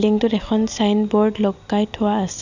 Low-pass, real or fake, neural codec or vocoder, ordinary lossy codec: 7.2 kHz; real; none; none